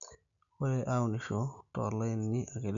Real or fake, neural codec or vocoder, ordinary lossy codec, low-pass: real; none; none; 7.2 kHz